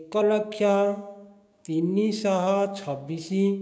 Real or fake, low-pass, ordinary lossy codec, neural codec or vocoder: fake; none; none; codec, 16 kHz, 6 kbps, DAC